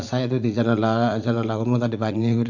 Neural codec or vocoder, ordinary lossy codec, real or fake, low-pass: vocoder, 44.1 kHz, 80 mel bands, Vocos; none; fake; 7.2 kHz